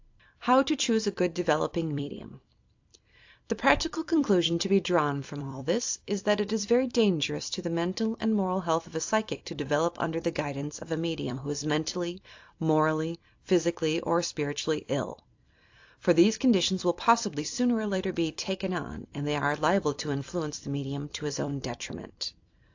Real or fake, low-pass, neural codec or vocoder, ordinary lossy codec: fake; 7.2 kHz; vocoder, 44.1 kHz, 128 mel bands every 256 samples, BigVGAN v2; AAC, 48 kbps